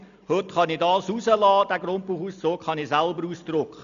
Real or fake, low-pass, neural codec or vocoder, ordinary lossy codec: real; 7.2 kHz; none; none